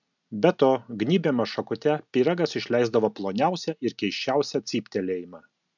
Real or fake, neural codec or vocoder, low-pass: real; none; 7.2 kHz